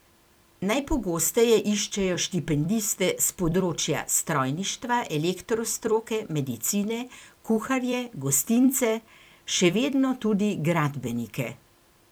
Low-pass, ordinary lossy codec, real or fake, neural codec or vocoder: none; none; fake; vocoder, 44.1 kHz, 128 mel bands every 256 samples, BigVGAN v2